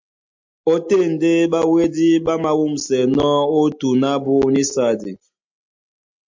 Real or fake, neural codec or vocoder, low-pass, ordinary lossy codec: real; none; 7.2 kHz; MP3, 48 kbps